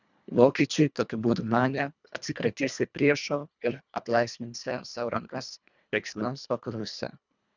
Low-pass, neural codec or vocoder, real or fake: 7.2 kHz; codec, 24 kHz, 1.5 kbps, HILCodec; fake